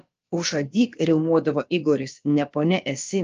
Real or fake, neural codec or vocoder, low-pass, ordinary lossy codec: fake; codec, 16 kHz, about 1 kbps, DyCAST, with the encoder's durations; 7.2 kHz; Opus, 24 kbps